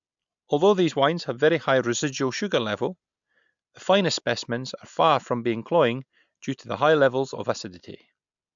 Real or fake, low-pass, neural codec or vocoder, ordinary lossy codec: real; 7.2 kHz; none; MP3, 64 kbps